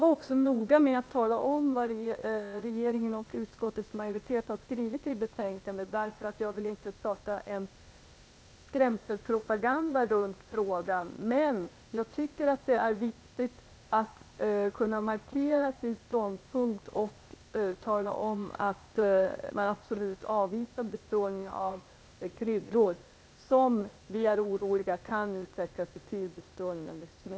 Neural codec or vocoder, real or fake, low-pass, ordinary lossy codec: codec, 16 kHz, 0.8 kbps, ZipCodec; fake; none; none